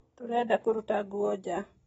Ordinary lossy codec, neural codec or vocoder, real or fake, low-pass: AAC, 24 kbps; none; real; 19.8 kHz